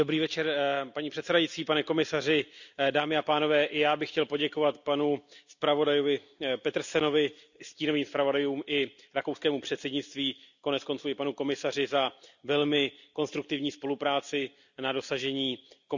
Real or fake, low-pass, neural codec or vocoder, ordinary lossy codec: real; 7.2 kHz; none; none